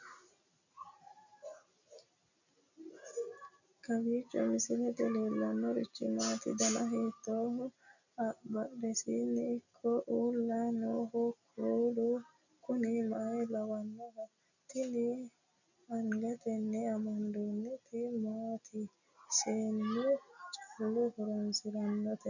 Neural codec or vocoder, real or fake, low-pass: none; real; 7.2 kHz